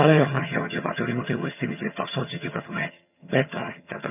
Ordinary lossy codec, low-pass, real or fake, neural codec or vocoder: none; 3.6 kHz; fake; vocoder, 22.05 kHz, 80 mel bands, HiFi-GAN